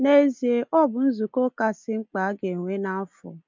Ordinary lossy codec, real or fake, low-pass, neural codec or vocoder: MP3, 64 kbps; real; 7.2 kHz; none